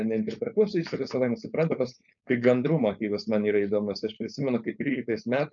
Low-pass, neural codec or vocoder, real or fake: 7.2 kHz; codec, 16 kHz, 4.8 kbps, FACodec; fake